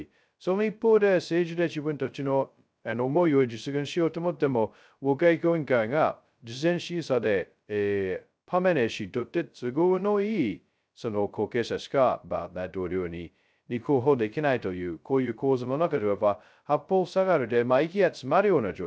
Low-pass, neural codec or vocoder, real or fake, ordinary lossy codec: none; codec, 16 kHz, 0.2 kbps, FocalCodec; fake; none